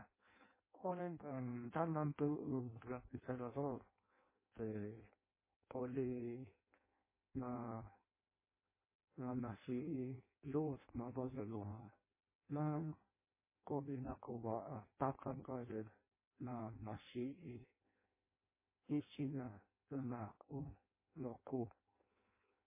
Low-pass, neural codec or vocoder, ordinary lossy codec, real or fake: 3.6 kHz; codec, 16 kHz in and 24 kHz out, 0.6 kbps, FireRedTTS-2 codec; MP3, 16 kbps; fake